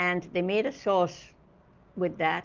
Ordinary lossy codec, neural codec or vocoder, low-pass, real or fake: Opus, 32 kbps; none; 7.2 kHz; real